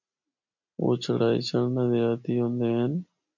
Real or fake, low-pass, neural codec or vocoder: real; 7.2 kHz; none